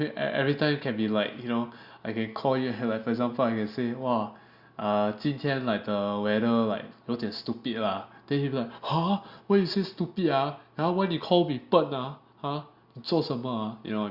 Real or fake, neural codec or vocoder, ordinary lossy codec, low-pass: real; none; Opus, 64 kbps; 5.4 kHz